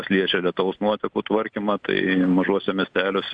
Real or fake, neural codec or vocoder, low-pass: real; none; 9.9 kHz